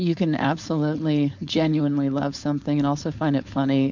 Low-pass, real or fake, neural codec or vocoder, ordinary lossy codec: 7.2 kHz; fake; codec, 16 kHz, 4.8 kbps, FACodec; MP3, 64 kbps